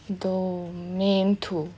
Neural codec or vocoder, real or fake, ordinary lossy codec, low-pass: none; real; none; none